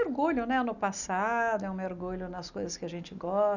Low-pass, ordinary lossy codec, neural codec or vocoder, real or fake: 7.2 kHz; none; none; real